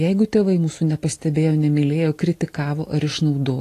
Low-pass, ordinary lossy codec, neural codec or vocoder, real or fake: 14.4 kHz; AAC, 48 kbps; none; real